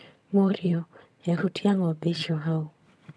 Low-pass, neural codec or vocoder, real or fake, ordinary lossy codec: none; vocoder, 22.05 kHz, 80 mel bands, HiFi-GAN; fake; none